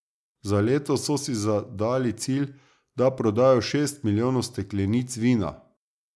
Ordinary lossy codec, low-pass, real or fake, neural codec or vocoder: none; none; real; none